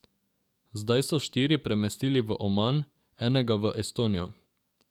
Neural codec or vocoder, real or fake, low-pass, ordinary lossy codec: codec, 44.1 kHz, 7.8 kbps, DAC; fake; 19.8 kHz; none